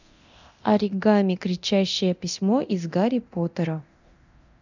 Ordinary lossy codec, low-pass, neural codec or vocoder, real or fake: none; 7.2 kHz; codec, 24 kHz, 0.9 kbps, DualCodec; fake